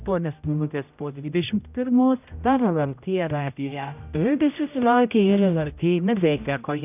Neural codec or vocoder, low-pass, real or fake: codec, 16 kHz, 0.5 kbps, X-Codec, HuBERT features, trained on general audio; 3.6 kHz; fake